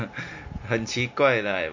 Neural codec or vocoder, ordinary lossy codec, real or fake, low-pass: none; MP3, 48 kbps; real; 7.2 kHz